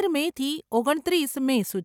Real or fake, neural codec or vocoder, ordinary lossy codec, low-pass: real; none; none; 19.8 kHz